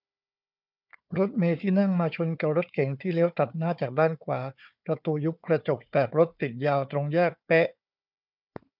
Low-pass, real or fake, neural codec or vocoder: 5.4 kHz; fake; codec, 16 kHz, 4 kbps, FunCodec, trained on Chinese and English, 50 frames a second